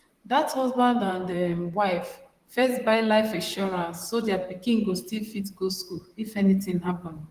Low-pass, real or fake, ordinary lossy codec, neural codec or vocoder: 14.4 kHz; fake; Opus, 24 kbps; vocoder, 44.1 kHz, 128 mel bands, Pupu-Vocoder